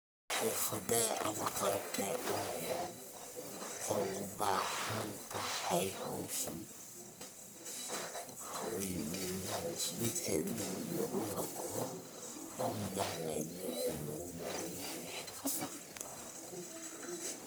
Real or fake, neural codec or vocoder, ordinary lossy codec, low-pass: fake; codec, 44.1 kHz, 1.7 kbps, Pupu-Codec; none; none